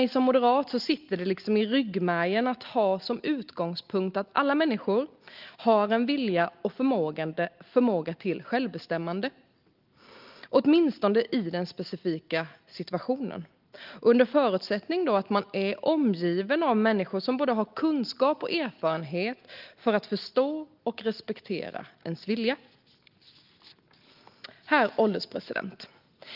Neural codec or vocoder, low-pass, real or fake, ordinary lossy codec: none; 5.4 kHz; real; Opus, 32 kbps